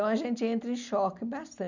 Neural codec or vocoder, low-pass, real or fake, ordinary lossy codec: none; 7.2 kHz; real; none